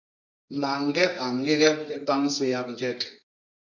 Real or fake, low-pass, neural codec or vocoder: fake; 7.2 kHz; codec, 24 kHz, 0.9 kbps, WavTokenizer, medium music audio release